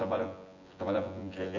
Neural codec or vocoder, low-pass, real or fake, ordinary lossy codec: vocoder, 24 kHz, 100 mel bands, Vocos; 7.2 kHz; fake; none